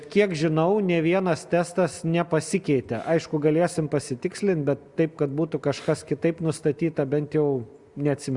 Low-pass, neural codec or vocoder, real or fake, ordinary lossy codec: 10.8 kHz; none; real; Opus, 64 kbps